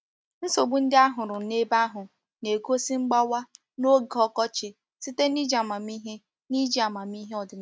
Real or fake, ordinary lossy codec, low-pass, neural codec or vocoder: real; none; none; none